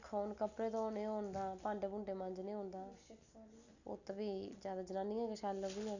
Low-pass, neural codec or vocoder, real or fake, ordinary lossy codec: 7.2 kHz; none; real; none